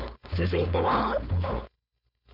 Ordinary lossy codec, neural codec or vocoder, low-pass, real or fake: none; codec, 16 kHz, 4.8 kbps, FACodec; 5.4 kHz; fake